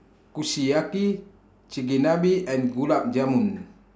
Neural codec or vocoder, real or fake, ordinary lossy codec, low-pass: none; real; none; none